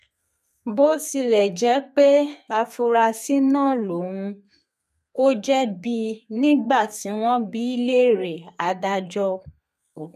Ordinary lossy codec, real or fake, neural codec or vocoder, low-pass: none; fake; codec, 32 kHz, 1.9 kbps, SNAC; 14.4 kHz